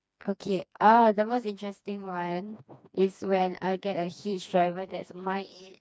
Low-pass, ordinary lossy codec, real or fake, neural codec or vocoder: none; none; fake; codec, 16 kHz, 2 kbps, FreqCodec, smaller model